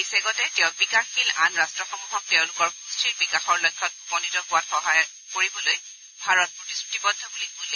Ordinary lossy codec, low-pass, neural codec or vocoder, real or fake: none; 7.2 kHz; none; real